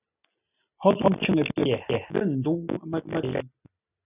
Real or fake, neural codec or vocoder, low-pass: real; none; 3.6 kHz